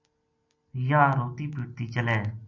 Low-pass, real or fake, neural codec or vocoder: 7.2 kHz; real; none